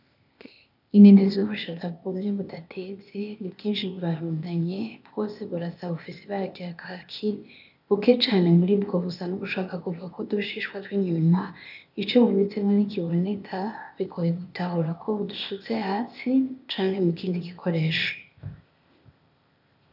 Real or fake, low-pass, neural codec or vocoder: fake; 5.4 kHz; codec, 16 kHz, 0.8 kbps, ZipCodec